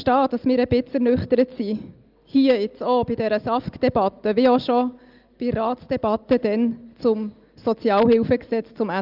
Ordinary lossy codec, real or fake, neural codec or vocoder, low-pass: Opus, 32 kbps; real; none; 5.4 kHz